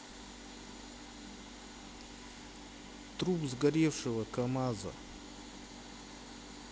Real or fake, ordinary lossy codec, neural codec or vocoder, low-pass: real; none; none; none